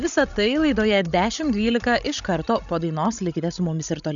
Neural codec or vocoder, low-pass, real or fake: codec, 16 kHz, 16 kbps, FreqCodec, larger model; 7.2 kHz; fake